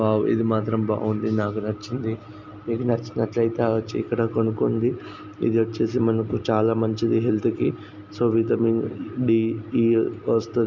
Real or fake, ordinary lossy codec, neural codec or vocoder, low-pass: real; MP3, 64 kbps; none; 7.2 kHz